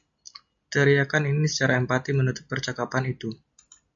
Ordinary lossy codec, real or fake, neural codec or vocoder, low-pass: MP3, 48 kbps; real; none; 7.2 kHz